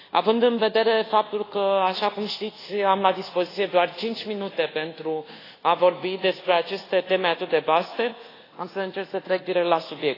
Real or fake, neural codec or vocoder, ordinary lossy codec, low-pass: fake; codec, 24 kHz, 1.2 kbps, DualCodec; AAC, 24 kbps; 5.4 kHz